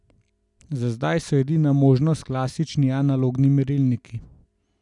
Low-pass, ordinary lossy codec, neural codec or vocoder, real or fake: 10.8 kHz; none; none; real